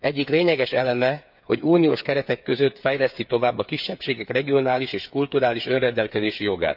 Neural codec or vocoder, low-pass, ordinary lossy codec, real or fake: codec, 16 kHz, 8 kbps, FreqCodec, smaller model; 5.4 kHz; none; fake